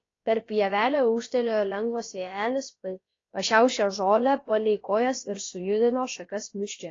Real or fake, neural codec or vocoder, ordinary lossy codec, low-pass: fake; codec, 16 kHz, about 1 kbps, DyCAST, with the encoder's durations; AAC, 32 kbps; 7.2 kHz